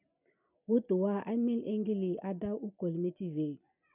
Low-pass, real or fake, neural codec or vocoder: 3.6 kHz; real; none